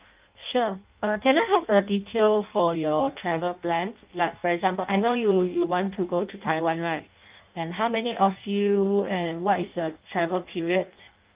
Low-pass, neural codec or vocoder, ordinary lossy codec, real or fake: 3.6 kHz; codec, 16 kHz in and 24 kHz out, 0.6 kbps, FireRedTTS-2 codec; Opus, 24 kbps; fake